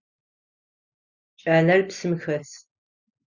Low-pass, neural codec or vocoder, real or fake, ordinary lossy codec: 7.2 kHz; none; real; Opus, 64 kbps